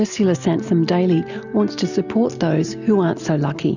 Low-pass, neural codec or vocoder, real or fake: 7.2 kHz; none; real